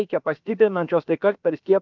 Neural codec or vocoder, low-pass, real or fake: codec, 16 kHz, about 1 kbps, DyCAST, with the encoder's durations; 7.2 kHz; fake